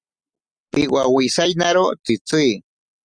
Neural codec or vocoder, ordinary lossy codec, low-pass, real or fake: none; Opus, 64 kbps; 9.9 kHz; real